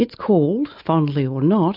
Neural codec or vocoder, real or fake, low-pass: none; real; 5.4 kHz